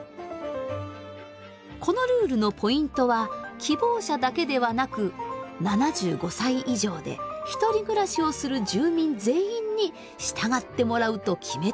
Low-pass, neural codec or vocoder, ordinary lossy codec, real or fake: none; none; none; real